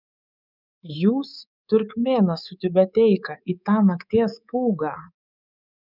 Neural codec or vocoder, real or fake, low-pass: codec, 24 kHz, 3.1 kbps, DualCodec; fake; 5.4 kHz